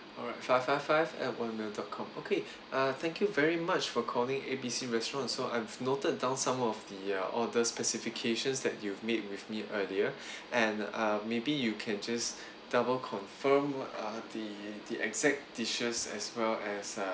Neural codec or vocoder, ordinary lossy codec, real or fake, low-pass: none; none; real; none